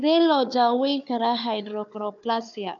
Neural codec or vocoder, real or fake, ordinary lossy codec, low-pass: codec, 16 kHz, 4 kbps, FunCodec, trained on Chinese and English, 50 frames a second; fake; none; 7.2 kHz